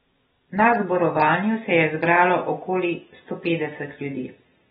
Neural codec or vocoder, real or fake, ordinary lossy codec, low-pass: none; real; AAC, 16 kbps; 19.8 kHz